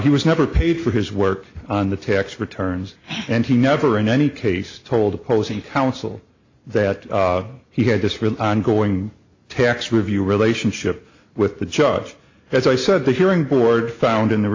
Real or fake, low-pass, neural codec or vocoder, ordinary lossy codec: real; 7.2 kHz; none; AAC, 48 kbps